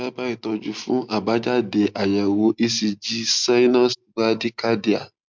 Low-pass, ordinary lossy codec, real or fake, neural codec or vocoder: 7.2 kHz; MP3, 64 kbps; real; none